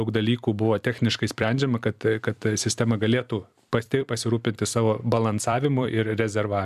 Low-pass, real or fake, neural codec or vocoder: 14.4 kHz; fake; vocoder, 44.1 kHz, 128 mel bands every 256 samples, BigVGAN v2